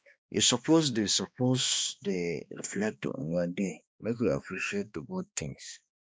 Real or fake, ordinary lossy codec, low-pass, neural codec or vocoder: fake; none; none; codec, 16 kHz, 2 kbps, X-Codec, HuBERT features, trained on balanced general audio